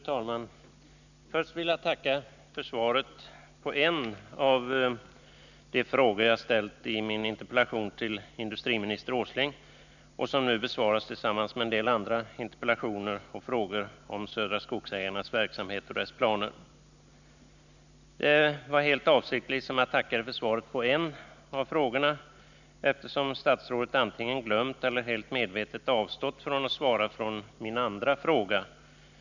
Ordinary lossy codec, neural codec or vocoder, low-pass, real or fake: none; none; 7.2 kHz; real